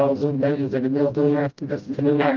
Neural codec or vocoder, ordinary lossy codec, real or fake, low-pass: codec, 16 kHz, 0.5 kbps, FreqCodec, smaller model; Opus, 24 kbps; fake; 7.2 kHz